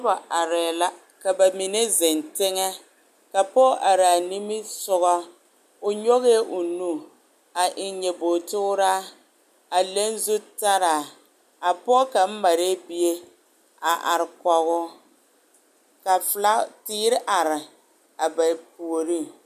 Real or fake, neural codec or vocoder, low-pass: real; none; 14.4 kHz